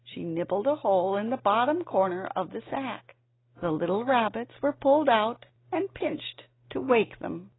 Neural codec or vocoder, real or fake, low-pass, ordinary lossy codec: none; real; 7.2 kHz; AAC, 16 kbps